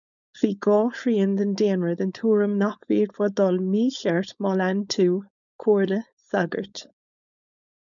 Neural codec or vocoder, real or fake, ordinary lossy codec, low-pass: codec, 16 kHz, 4.8 kbps, FACodec; fake; MP3, 96 kbps; 7.2 kHz